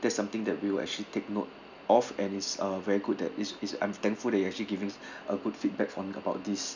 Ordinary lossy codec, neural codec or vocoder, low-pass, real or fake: none; none; 7.2 kHz; real